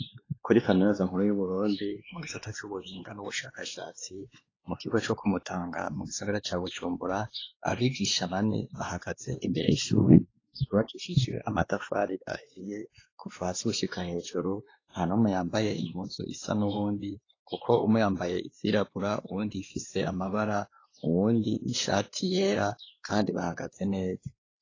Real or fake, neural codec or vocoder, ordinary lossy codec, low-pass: fake; codec, 16 kHz, 2 kbps, X-Codec, WavLM features, trained on Multilingual LibriSpeech; AAC, 32 kbps; 7.2 kHz